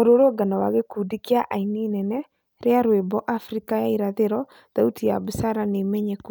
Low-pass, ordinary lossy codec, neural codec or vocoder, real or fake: none; none; none; real